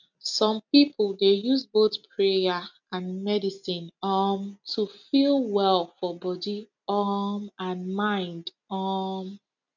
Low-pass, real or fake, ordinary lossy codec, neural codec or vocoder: 7.2 kHz; real; none; none